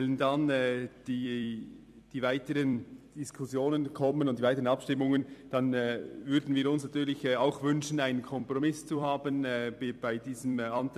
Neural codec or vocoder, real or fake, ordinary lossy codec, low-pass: vocoder, 44.1 kHz, 128 mel bands every 256 samples, BigVGAN v2; fake; AAC, 96 kbps; 14.4 kHz